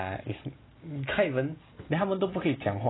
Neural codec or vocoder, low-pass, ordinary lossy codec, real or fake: none; 7.2 kHz; AAC, 16 kbps; real